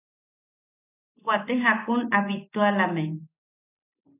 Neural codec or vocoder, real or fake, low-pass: none; real; 3.6 kHz